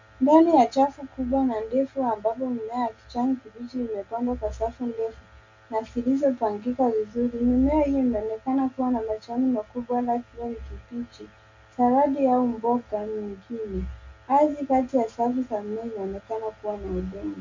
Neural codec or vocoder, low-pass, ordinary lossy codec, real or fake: none; 7.2 kHz; MP3, 64 kbps; real